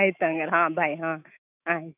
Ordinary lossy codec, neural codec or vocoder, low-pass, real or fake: none; autoencoder, 48 kHz, 128 numbers a frame, DAC-VAE, trained on Japanese speech; 3.6 kHz; fake